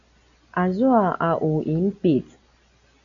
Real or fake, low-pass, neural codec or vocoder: real; 7.2 kHz; none